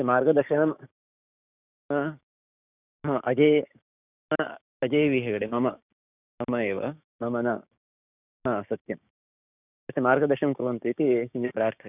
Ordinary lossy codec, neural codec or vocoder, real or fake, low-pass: none; codec, 16 kHz, 6 kbps, DAC; fake; 3.6 kHz